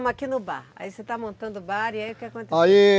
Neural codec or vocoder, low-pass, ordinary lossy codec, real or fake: none; none; none; real